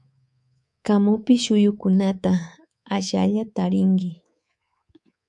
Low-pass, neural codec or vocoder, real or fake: 10.8 kHz; codec, 24 kHz, 3.1 kbps, DualCodec; fake